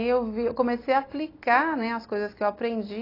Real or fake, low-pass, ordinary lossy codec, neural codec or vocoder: real; 5.4 kHz; AAC, 24 kbps; none